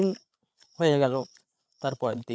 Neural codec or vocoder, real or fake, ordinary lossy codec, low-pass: codec, 16 kHz, 8 kbps, FreqCodec, larger model; fake; none; none